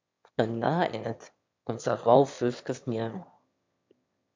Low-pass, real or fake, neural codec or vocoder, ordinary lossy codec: 7.2 kHz; fake; autoencoder, 22.05 kHz, a latent of 192 numbers a frame, VITS, trained on one speaker; MP3, 64 kbps